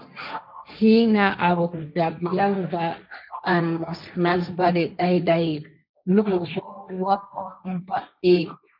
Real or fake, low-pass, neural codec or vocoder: fake; 5.4 kHz; codec, 16 kHz, 1.1 kbps, Voila-Tokenizer